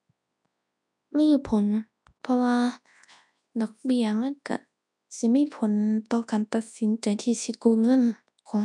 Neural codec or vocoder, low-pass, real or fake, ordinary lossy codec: codec, 24 kHz, 0.9 kbps, WavTokenizer, large speech release; none; fake; none